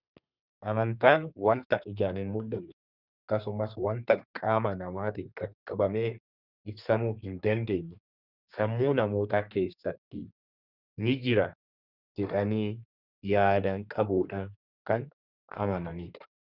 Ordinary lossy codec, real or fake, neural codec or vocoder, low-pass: Opus, 64 kbps; fake; codec, 32 kHz, 1.9 kbps, SNAC; 5.4 kHz